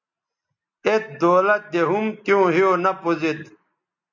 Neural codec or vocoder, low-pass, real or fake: none; 7.2 kHz; real